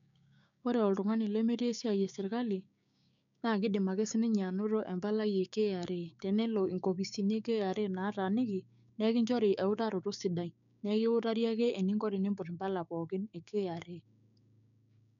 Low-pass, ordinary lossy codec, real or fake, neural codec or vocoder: 7.2 kHz; none; fake; codec, 16 kHz, 6 kbps, DAC